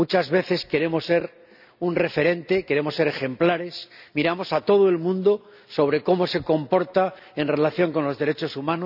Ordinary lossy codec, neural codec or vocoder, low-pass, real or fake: none; none; 5.4 kHz; real